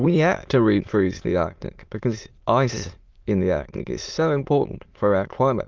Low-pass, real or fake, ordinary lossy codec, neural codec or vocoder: 7.2 kHz; fake; Opus, 24 kbps; autoencoder, 22.05 kHz, a latent of 192 numbers a frame, VITS, trained on many speakers